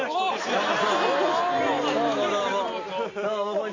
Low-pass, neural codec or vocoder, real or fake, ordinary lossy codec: 7.2 kHz; none; real; AAC, 32 kbps